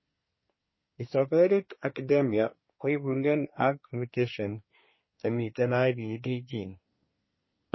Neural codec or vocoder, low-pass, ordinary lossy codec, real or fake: codec, 24 kHz, 1 kbps, SNAC; 7.2 kHz; MP3, 24 kbps; fake